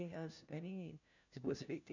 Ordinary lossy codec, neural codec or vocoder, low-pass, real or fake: none; codec, 16 kHz, 1 kbps, FunCodec, trained on LibriTTS, 50 frames a second; 7.2 kHz; fake